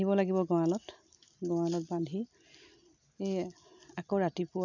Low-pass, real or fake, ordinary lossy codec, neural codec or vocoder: 7.2 kHz; real; none; none